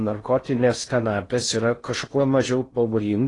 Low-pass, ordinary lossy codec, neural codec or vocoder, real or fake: 10.8 kHz; AAC, 32 kbps; codec, 16 kHz in and 24 kHz out, 0.6 kbps, FocalCodec, streaming, 4096 codes; fake